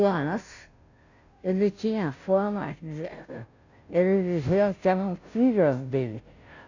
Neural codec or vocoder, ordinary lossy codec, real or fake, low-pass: codec, 16 kHz, 0.5 kbps, FunCodec, trained on Chinese and English, 25 frames a second; none; fake; 7.2 kHz